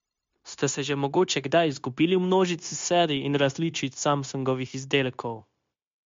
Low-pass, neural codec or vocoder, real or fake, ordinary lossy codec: 7.2 kHz; codec, 16 kHz, 0.9 kbps, LongCat-Audio-Codec; fake; MP3, 48 kbps